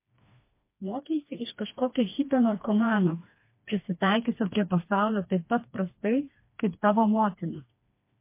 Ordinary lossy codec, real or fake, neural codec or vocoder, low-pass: MP3, 24 kbps; fake; codec, 16 kHz, 2 kbps, FreqCodec, smaller model; 3.6 kHz